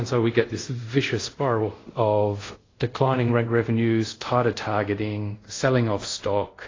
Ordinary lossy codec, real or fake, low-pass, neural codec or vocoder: AAC, 32 kbps; fake; 7.2 kHz; codec, 24 kHz, 0.5 kbps, DualCodec